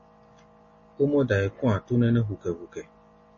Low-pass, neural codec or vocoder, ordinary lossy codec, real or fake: 7.2 kHz; none; MP3, 32 kbps; real